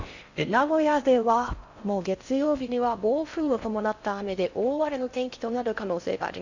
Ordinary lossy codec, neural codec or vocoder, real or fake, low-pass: Opus, 64 kbps; codec, 16 kHz in and 24 kHz out, 0.6 kbps, FocalCodec, streaming, 4096 codes; fake; 7.2 kHz